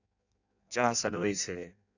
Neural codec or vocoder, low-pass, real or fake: codec, 16 kHz in and 24 kHz out, 0.6 kbps, FireRedTTS-2 codec; 7.2 kHz; fake